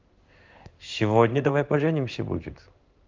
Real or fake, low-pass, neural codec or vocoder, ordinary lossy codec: fake; 7.2 kHz; codec, 16 kHz in and 24 kHz out, 1 kbps, XY-Tokenizer; Opus, 32 kbps